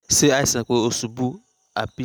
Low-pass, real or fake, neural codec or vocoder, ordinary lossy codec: none; real; none; none